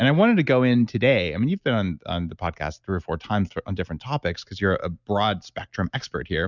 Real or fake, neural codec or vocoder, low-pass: real; none; 7.2 kHz